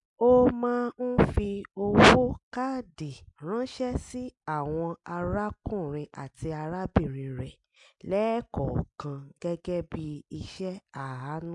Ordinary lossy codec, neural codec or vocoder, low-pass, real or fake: MP3, 64 kbps; none; 10.8 kHz; real